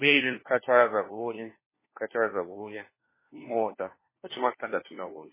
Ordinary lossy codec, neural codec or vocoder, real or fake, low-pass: MP3, 16 kbps; codec, 16 kHz, 1 kbps, FunCodec, trained on LibriTTS, 50 frames a second; fake; 3.6 kHz